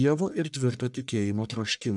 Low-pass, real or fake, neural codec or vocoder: 10.8 kHz; fake; codec, 44.1 kHz, 1.7 kbps, Pupu-Codec